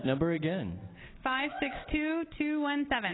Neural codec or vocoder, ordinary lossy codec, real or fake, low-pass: none; AAC, 16 kbps; real; 7.2 kHz